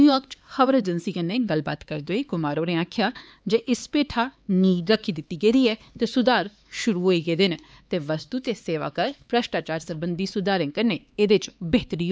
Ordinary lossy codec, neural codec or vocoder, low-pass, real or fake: none; codec, 16 kHz, 4 kbps, X-Codec, HuBERT features, trained on LibriSpeech; none; fake